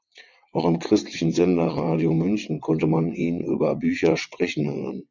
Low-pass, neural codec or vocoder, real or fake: 7.2 kHz; vocoder, 22.05 kHz, 80 mel bands, WaveNeXt; fake